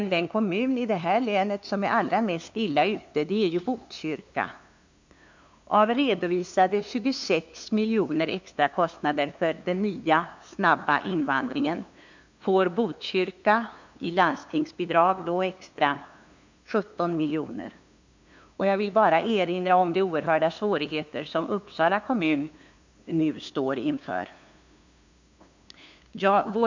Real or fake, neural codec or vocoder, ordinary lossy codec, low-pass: fake; codec, 16 kHz, 2 kbps, FunCodec, trained on LibriTTS, 25 frames a second; MP3, 64 kbps; 7.2 kHz